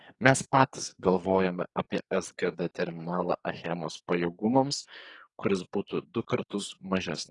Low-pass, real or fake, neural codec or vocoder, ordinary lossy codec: 10.8 kHz; fake; codec, 44.1 kHz, 2.6 kbps, SNAC; AAC, 32 kbps